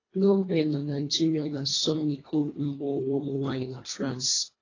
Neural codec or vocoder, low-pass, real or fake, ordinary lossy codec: codec, 24 kHz, 1.5 kbps, HILCodec; 7.2 kHz; fake; AAC, 32 kbps